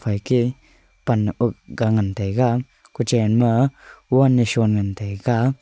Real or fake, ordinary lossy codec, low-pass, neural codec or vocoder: real; none; none; none